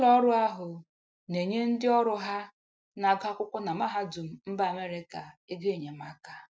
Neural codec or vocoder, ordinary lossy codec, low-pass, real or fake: none; none; none; real